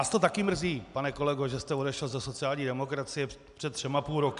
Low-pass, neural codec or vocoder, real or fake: 10.8 kHz; none; real